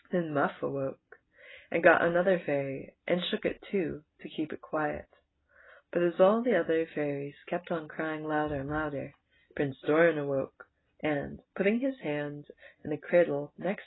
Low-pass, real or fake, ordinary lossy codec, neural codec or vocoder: 7.2 kHz; real; AAC, 16 kbps; none